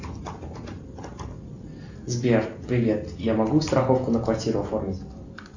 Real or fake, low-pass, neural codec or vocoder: real; 7.2 kHz; none